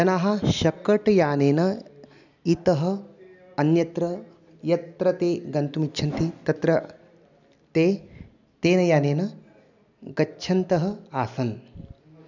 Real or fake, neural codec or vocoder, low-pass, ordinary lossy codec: real; none; 7.2 kHz; none